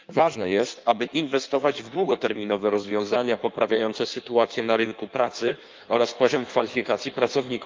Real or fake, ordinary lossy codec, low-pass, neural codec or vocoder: fake; Opus, 24 kbps; 7.2 kHz; codec, 16 kHz in and 24 kHz out, 1.1 kbps, FireRedTTS-2 codec